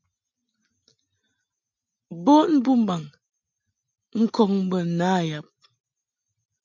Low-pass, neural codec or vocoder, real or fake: 7.2 kHz; none; real